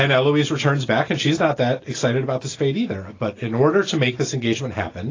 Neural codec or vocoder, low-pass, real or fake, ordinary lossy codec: none; 7.2 kHz; real; AAC, 32 kbps